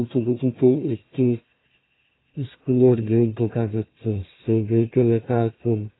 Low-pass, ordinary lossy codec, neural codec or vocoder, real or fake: 7.2 kHz; AAC, 16 kbps; codec, 16 kHz, 1 kbps, FunCodec, trained on Chinese and English, 50 frames a second; fake